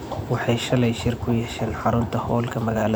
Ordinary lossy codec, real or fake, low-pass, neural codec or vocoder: none; fake; none; vocoder, 44.1 kHz, 128 mel bands every 512 samples, BigVGAN v2